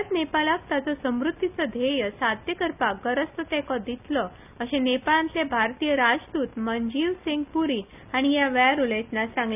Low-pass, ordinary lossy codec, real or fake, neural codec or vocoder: 3.6 kHz; none; real; none